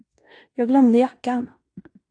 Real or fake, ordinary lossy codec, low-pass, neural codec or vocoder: fake; AAC, 48 kbps; 9.9 kHz; codec, 16 kHz in and 24 kHz out, 0.9 kbps, LongCat-Audio-Codec, fine tuned four codebook decoder